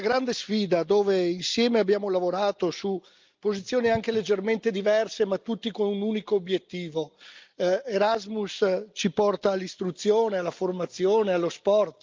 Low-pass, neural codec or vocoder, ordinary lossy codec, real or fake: 7.2 kHz; none; Opus, 24 kbps; real